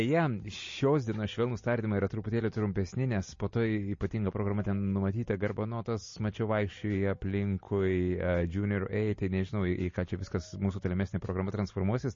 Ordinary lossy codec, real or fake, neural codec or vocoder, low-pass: MP3, 32 kbps; real; none; 7.2 kHz